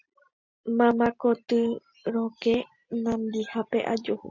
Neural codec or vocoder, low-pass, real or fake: none; 7.2 kHz; real